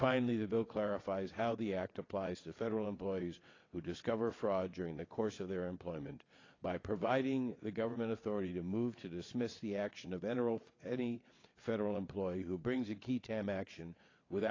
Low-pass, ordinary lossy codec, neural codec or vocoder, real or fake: 7.2 kHz; AAC, 32 kbps; vocoder, 22.05 kHz, 80 mel bands, WaveNeXt; fake